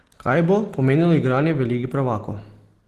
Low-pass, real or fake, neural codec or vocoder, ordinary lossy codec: 14.4 kHz; real; none; Opus, 16 kbps